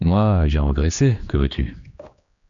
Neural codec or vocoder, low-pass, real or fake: codec, 16 kHz, 2 kbps, X-Codec, HuBERT features, trained on general audio; 7.2 kHz; fake